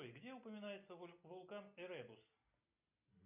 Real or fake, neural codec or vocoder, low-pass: real; none; 3.6 kHz